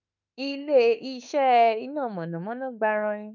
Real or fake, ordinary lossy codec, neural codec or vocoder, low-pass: fake; none; autoencoder, 48 kHz, 32 numbers a frame, DAC-VAE, trained on Japanese speech; 7.2 kHz